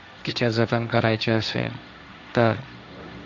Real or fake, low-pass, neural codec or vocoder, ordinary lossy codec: fake; 7.2 kHz; codec, 16 kHz, 1.1 kbps, Voila-Tokenizer; none